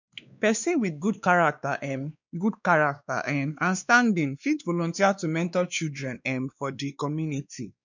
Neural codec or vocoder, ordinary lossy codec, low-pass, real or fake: codec, 16 kHz, 2 kbps, X-Codec, WavLM features, trained on Multilingual LibriSpeech; none; 7.2 kHz; fake